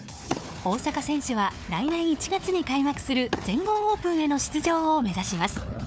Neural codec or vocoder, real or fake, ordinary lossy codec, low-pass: codec, 16 kHz, 4 kbps, FunCodec, trained on Chinese and English, 50 frames a second; fake; none; none